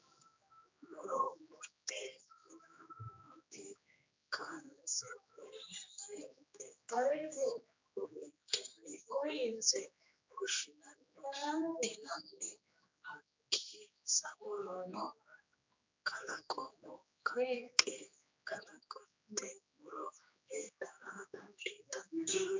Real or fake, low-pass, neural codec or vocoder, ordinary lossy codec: fake; 7.2 kHz; codec, 16 kHz, 2 kbps, X-Codec, HuBERT features, trained on general audio; MP3, 64 kbps